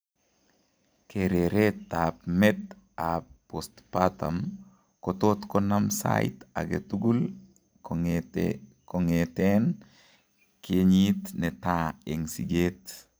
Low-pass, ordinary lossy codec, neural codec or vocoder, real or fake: none; none; none; real